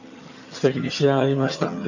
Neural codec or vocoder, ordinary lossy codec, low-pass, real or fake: vocoder, 22.05 kHz, 80 mel bands, HiFi-GAN; none; 7.2 kHz; fake